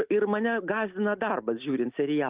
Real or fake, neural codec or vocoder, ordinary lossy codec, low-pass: real; none; Opus, 32 kbps; 3.6 kHz